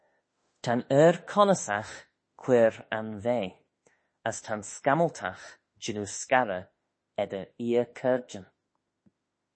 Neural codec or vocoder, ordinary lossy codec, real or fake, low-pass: autoencoder, 48 kHz, 32 numbers a frame, DAC-VAE, trained on Japanese speech; MP3, 32 kbps; fake; 9.9 kHz